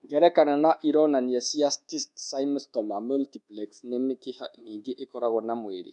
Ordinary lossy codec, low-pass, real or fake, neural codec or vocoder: none; 10.8 kHz; fake; codec, 24 kHz, 1.2 kbps, DualCodec